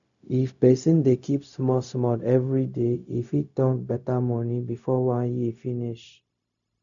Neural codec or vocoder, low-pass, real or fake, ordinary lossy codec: codec, 16 kHz, 0.4 kbps, LongCat-Audio-Codec; 7.2 kHz; fake; none